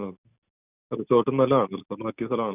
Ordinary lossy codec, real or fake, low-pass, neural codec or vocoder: none; real; 3.6 kHz; none